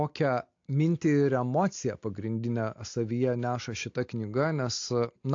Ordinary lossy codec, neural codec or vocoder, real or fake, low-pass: AAC, 48 kbps; none; real; 7.2 kHz